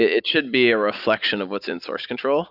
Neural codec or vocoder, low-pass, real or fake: none; 5.4 kHz; real